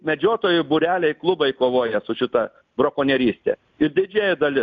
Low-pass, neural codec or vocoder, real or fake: 7.2 kHz; none; real